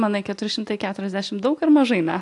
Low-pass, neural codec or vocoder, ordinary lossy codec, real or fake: 10.8 kHz; none; AAC, 64 kbps; real